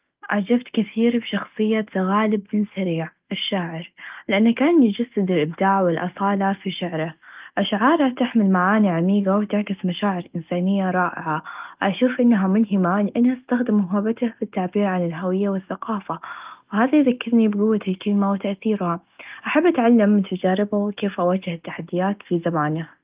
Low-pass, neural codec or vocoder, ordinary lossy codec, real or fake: 3.6 kHz; none; Opus, 24 kbps; real